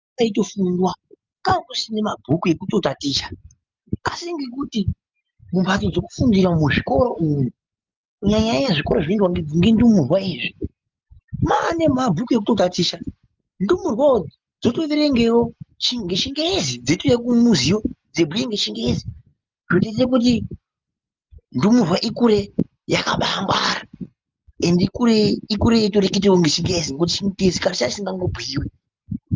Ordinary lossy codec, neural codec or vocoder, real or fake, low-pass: Opus, 32 kbps; none; real; 7.2 kHz